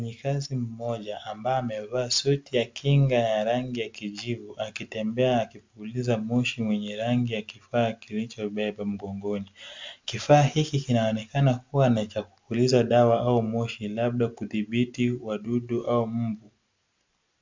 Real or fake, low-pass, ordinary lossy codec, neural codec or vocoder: real; 7.2 kHz; MP3, 64 kbps; none